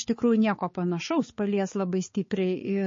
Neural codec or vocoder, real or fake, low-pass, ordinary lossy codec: codec, 16 kHz, 4 kbps, X-Codec, HuBERT features, trained on balanced general audio; fake; 7.2 kHz; MP3, 32 kbps